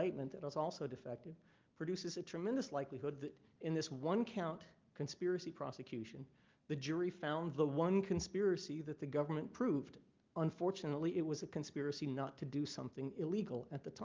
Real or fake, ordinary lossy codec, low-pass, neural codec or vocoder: real; Opus, 24 kbps; 7.2 kHz; none